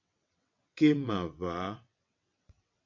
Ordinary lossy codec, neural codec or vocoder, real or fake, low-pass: MP3, 48 kbps; vocoder, 22.05 kHz, 80 mel bands, WaveNeXt; fake; 7.2 kHz